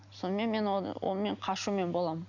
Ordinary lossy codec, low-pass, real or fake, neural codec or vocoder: none; 7.2 kHz; real; none